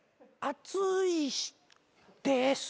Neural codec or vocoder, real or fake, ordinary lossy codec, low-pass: none; real; none; none